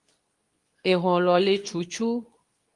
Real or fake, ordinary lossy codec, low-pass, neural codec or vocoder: fake; Opus, 32 kbps; 10.8 kHz; codec, 24 kHz, 0.9 kbps, WavTokenizer, medium speech release version 2